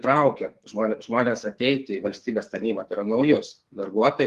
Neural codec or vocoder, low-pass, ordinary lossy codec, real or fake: codec, 44.1 kHz, 2.6 kbps, SNAC; 14.4 kHz; Opus, 24 kbps; fake